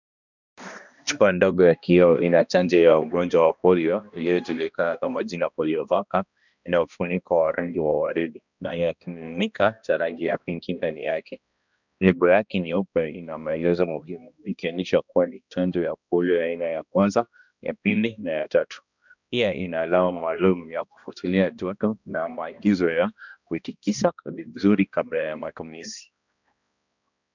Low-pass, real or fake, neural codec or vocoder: 7.2 kHz; fake; codec, 16 kHz, 1 kbps, X-Codec, HuBERT features, trained on balanced general audio